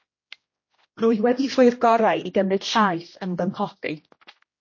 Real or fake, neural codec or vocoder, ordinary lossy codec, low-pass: fake; codec, 16 kHz, 1 kbps, X-Codec, HuBERT features, trained on general audio; MP3, 32 kbps; 7.2 kHz